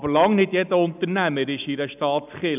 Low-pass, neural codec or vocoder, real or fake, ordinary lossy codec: 3.6 kHz; none; real; none